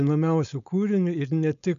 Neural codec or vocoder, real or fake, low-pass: codec, 16 kHz, 4.8 kbps, FACodec; fake; 7.2 kHz